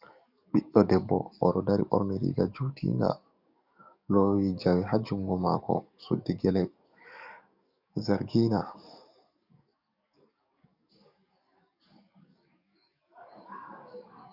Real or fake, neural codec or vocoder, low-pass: fake; codec, 44.1 kHz, 7.8 kbps, DAC; 5.4 kHz